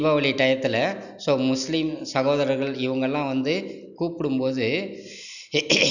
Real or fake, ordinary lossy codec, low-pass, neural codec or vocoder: real; none; 7.2 kHz; none